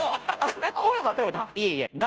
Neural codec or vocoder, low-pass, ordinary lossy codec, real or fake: codec, 16 kHz, 0.5 kbps, FunCodec, trained on Chinese and English, 25 frames a second; none; none; fake